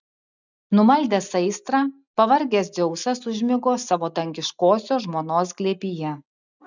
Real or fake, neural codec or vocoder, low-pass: real; none; 7.2 kHz